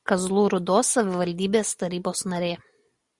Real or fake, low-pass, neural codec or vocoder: real; 10.8 kHz; none